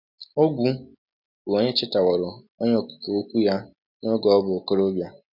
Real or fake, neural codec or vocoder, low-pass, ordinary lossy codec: real; none; 5.4 kHz; none